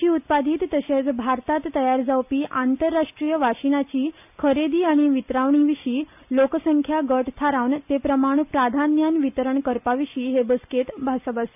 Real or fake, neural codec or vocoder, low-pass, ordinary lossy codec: real; none; 3.6 kHz; none